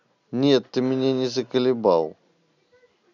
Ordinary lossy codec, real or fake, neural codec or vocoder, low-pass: none; real; none; 7.2 kHz